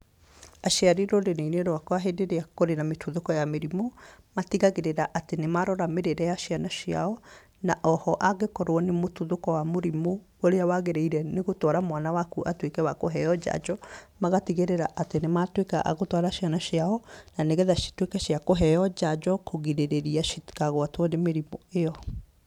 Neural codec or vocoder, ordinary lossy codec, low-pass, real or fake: vocoder, 44.1 kHz, 128 mel bands every 512 samples, BigVGAN v2; none; 19.8 kHz; fake